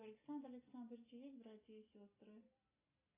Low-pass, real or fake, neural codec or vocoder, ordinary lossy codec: 3.6 kHz; real; none; AAC, 16 kbps